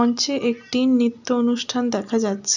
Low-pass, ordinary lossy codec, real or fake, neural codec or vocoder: 7.2 kHz; none; real; none